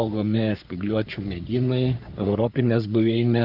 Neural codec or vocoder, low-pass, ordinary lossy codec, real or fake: codec, 44.1 kHz, 3.4 kbps, Pupu-Codec; 5.4 kHz; Opus, 32 kbps; fake